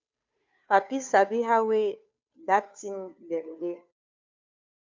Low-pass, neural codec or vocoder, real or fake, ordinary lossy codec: 7.2 kHz; codec, 16 kHz, 2 kbps, FunCodec, trained on Chinese and English, 25 frames a second; fake; MP3, 64 kbps